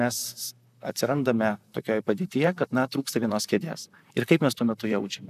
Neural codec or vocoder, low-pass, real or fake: vocoder, 44.1 kHz, 128 mel bands, Pupu-Vocoder; 14.4 kHz; fake